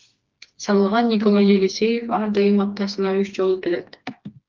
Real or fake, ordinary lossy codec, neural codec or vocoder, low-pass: fake; Opus, 32 kbps; codec, 16 kHz, 2 kbps, FreqCodec, smaller model; 7.2 kHz